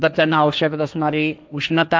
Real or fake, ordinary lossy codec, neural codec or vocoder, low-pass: fake; none; codec, 16 kHz, 1.1 kbps, Voila-Tokenizer; none